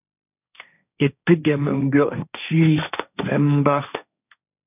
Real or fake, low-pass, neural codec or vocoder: fake; 3.6 kHz; codec, 16 kHz, 1.1 kbps, Voila-Tokenizer